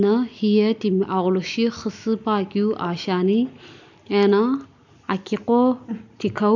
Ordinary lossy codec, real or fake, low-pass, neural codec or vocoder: none; real; 7.2 kHz; none